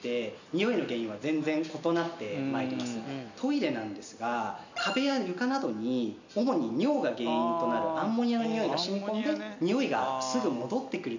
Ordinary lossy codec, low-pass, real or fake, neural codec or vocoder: none; 7.2 kHz; real; none